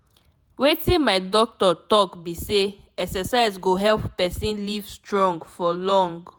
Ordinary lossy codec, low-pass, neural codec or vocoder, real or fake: none; none; vocoder, 48 kHz, 128 mel bands, Vocos; fake